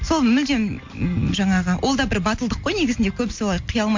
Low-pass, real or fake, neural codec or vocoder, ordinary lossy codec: 7.2 kHz; real; none; MP3, 48 kbps